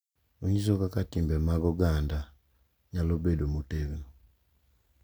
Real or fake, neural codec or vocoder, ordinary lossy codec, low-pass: real; none; none; none